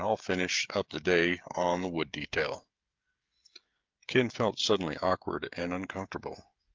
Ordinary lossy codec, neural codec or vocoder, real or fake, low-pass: Opus, 24 kbps; codec, 16 kHz, 16 kbps, FreqCodec, smaller model; fake; 7.2 kHz